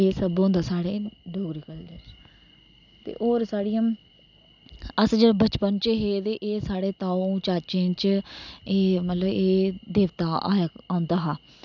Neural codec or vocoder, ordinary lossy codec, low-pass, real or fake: none; none; 7.2 kHz; real